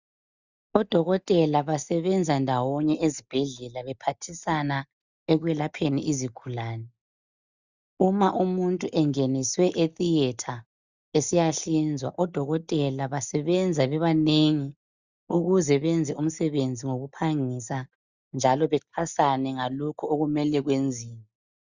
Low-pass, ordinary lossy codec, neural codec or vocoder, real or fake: 7.2 kHz; Opus, 64 kbps; none; real